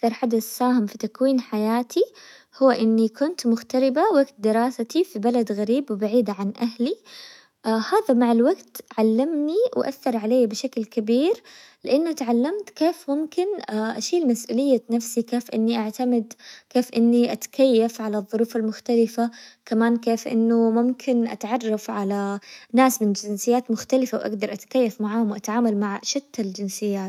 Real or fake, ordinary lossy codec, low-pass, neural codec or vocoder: fake; none; 19.8 kHz; autoencoder, 48 kHz, 128 numbers a frame, DAC-VAE, trained on Japanese speech